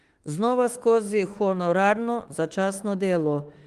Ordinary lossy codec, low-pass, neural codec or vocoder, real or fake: Opus, 24 kbps; 14.4 kHz; autoencoder, 48 kHz, 32 numbers a frame, DAC-VAE, trained on Japanese speech; fake